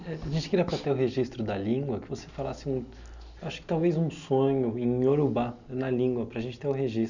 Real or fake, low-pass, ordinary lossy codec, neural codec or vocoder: real; 7.2 kHz; none; none